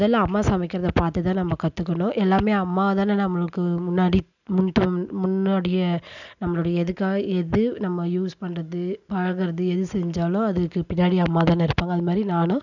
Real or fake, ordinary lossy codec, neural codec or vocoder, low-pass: real; none; none; 7.2 kHz